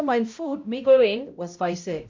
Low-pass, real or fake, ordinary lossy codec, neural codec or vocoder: 7.2 kHz; fake; MP3, 48 kbps; codec, 16 kHz, 0.5 kbps, X-Codec, HuBERT features, trained on balanced general audio